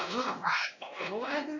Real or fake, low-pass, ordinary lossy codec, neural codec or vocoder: fake; 7.2 kHz; none; codec, 16 kHz, 1 kbps, X-Codec, WavLM features, trained on Multilingual LibriSpeech